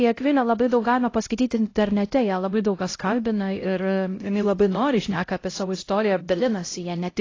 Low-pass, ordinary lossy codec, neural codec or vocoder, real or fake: 7.2 kHz; AAC, 32 kbps; codec, 16 kHz, 0.5 kbps, X-Codec, HuBERT features, trained on LibriSpeech; fake